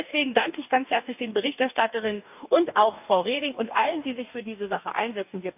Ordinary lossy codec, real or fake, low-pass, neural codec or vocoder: none; fake; 3.6 kHz; codec, 44.1 kHz, 2.6 kbps, DAC